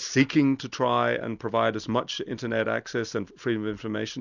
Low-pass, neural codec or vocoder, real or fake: 7.2 kHz; none; real